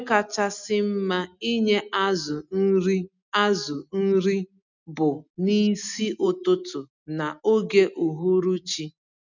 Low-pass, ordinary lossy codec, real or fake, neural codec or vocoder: 7.2 kHz; none; real; none